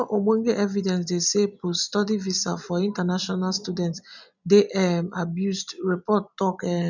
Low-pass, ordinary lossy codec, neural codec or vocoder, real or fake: 7.2 kHz; none; none; real